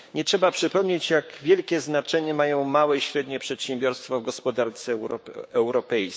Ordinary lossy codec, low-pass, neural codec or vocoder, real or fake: none; none; codec, 16 kHz, 6 kbps, DAC; fake